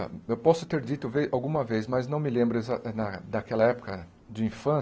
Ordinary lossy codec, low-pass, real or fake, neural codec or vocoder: none; none; real; none